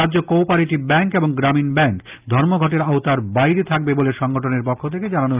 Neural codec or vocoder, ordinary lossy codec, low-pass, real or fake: none; Opus, 24 kbps; 3.6 kHz; real